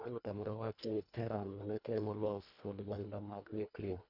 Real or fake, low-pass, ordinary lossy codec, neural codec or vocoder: fake; 5.4 kHz; none; codec, 24 kHz, 1.5 kbps, HILCodec